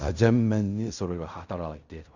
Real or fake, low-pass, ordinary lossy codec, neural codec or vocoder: fake; 7.2 kHz; none; codec, 16 kHz in and 24 kHz out, 0.4 kbps, LongCat-Audio-Codec, fine tuned four codebook decoder